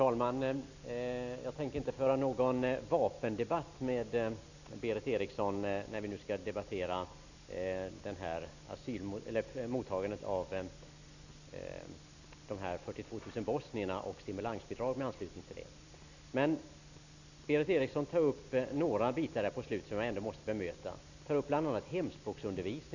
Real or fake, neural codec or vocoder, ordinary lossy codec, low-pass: real; none; none; 7.2 kHz